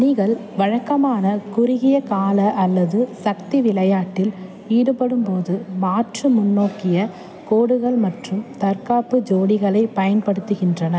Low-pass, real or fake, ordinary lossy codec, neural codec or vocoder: none; real; none; none